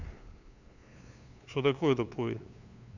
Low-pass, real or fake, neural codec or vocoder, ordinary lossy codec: 7.2 kHz; fake; codec, 16 kHz, 2 kbps, FunCodec, trained on LibriTTS, 25 frames a second; none